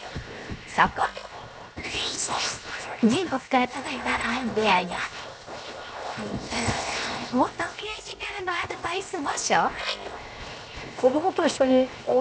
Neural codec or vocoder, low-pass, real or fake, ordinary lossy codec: codec, 16 kHz, 0.7 kbps, FocalCodec; none; fake; none